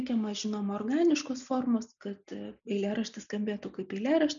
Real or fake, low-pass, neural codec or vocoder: real; 7.2 kHz; none